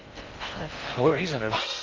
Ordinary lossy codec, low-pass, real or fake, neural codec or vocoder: Opus, 24 kbps; 7.2 kHz; fake; codec, 16 kHz in and 24 kHz out, 0.6 kbps, FocalCodec, streaming, 2048 codes